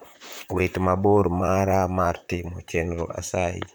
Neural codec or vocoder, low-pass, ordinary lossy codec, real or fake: vocoder, 44.1 kHz, 128 mel bands, Pupu-Vocoder; none; none; fake